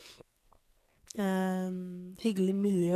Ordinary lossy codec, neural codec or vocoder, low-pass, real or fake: none; codec, 44.1 kHz, 2.6 kbps, SNAC; 14.4 kHz; fake